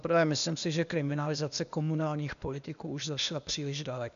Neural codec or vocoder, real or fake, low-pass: codec, 16 kHz, 0.8 kbps, ZipCodec; fake; 7.2 kHz